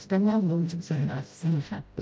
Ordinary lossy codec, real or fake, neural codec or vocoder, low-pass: none; fake; codec, 16 kHz, 0.5 kbps, FreqCodec, smaller model; none